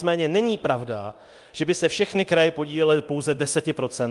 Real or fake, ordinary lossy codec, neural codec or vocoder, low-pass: fake; Opus, 32 kbps; codec, 24 kHz, 0.9 kbps, DualCodec; 10.8 kHz